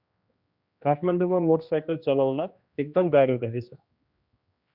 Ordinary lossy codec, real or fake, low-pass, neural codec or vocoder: Opus, 64 kbps; fake; 5.4 kHz; codec, 16 kHz, 1 kbps, X-Codec, HuBERT features, trained on general audio